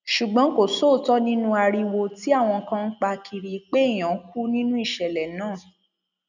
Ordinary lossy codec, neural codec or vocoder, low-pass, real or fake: none; none; 7.2 kHz; real